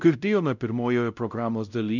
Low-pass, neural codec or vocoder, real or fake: 7.2 kHz; codec, 16 kHz, 0.5 kbps, X-Codec, WavLM features, trained on Multilingual LibriSpeech; fake